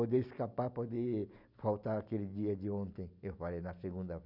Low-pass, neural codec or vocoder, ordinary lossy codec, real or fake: 5.4 kHz; none; none; real